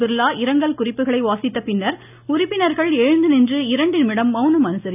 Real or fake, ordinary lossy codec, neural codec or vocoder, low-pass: real; none; none; 3.6 kHz